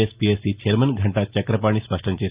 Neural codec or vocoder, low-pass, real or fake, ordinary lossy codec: none; 3.6 kHz; real; Opus, 24 kbps